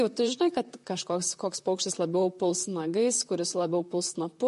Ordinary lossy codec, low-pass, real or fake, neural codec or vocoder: MP3, 48 kbps; 14.4 kHz; fake; vocoder, 44.1 kHz, 128 mel bands, Pupu-Vocoder